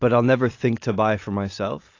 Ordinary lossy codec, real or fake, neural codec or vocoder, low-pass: AAC, 48 kbps; real; none; 7.2 kHz